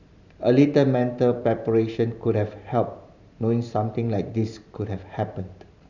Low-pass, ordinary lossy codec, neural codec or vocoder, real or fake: 7.2 kHz; none; vocoder, 44.1 kHz, 128 mel bands every 512 samples, BigVGAN v2; fake